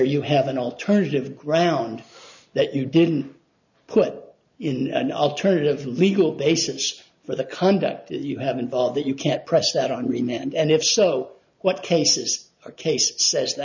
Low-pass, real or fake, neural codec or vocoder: 7.2 kHz; real; none